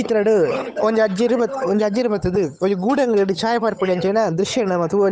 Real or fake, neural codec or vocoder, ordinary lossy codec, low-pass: fake; codec, 16 kHz, 8 kbps, FunCodec, trained on Chinese and English, 25 frames a second; none; none